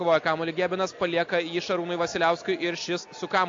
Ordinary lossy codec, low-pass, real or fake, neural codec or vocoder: AAC, 48 kbps; 7.2 kHz; real; none